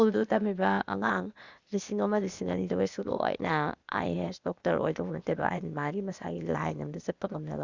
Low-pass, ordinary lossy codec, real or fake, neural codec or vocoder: 7.2 kHz; Opus, 64 kbps; fake; codec, 16 kHz, 0.8 kbps, ZipCodec